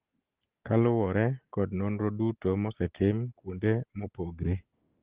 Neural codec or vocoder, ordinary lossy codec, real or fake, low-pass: none; Opus, 16 kbps; real; 3.6 kHz